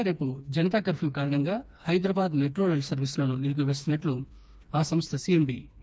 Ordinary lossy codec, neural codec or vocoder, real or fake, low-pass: none; codec, 16 kHz, 2 kbps, FreqCodec, smaller model; fake; none